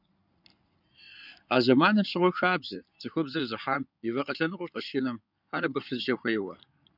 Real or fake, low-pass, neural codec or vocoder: fake; 5.4 kHz; codec, 16 kHz in and 24 kHz out, 2.2 kbps, FireRedTTS-2 codec